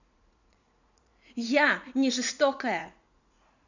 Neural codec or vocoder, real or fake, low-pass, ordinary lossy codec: vocoder, 44.1 kHz, 80 mel bands, Vocos; fake; 7.2 kHz; none